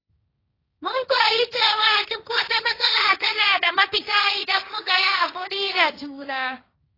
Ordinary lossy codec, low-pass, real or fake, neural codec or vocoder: AAC, 24 kbps; 5.4 kHz; fake; codec, 16 kHz, 1.1 kbps, Voila-Tokenizer